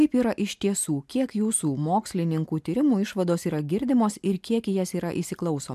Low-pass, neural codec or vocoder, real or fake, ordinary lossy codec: 14.4 kHz; none; real; MP3, 96 kbps